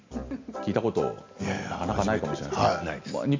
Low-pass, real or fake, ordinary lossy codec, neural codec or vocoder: 7.2 kHz; real; MP3, 48 kbps; none